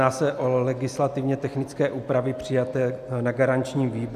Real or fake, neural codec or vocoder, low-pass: real; none; 14.4 kHz